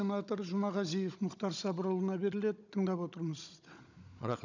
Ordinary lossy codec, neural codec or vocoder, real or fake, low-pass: none; codec, 16 kHz, 16 kbps, FunCodec, trained on Chinese and English, 50 frames a second; fake; 7.2 kHz